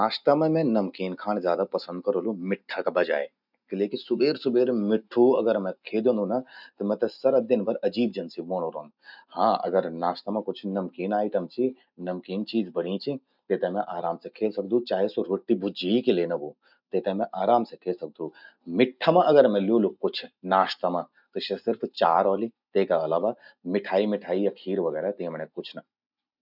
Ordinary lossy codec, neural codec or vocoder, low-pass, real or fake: none; none; 5.4 kHz; real